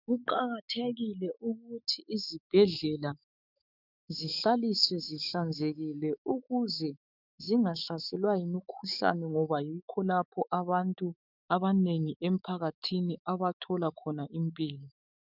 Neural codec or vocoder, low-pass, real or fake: autoencoder, 48 kHz, 128 numbers a frame, DAC-VAE, trained on Japanese speech; 5.4 kHz; fake